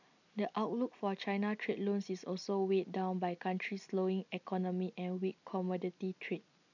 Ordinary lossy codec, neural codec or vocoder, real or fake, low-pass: none; none; real; 7.2 kHz